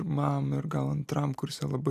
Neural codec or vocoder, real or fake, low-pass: none; real; 14.4 kHz